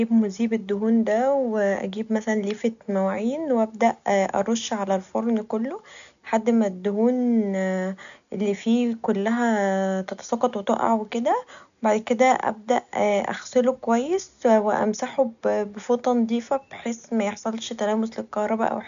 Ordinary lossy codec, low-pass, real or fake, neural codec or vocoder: none; 7.2 kHz; real; none